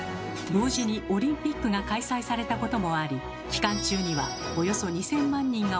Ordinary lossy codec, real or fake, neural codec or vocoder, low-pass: none; real; none; none